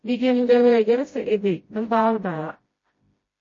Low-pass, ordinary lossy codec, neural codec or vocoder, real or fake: 7.2 kHz; MP3, 32 kbps; codec, 16 kHz, 0.5 kbps, FreqCodec, smaller model; fake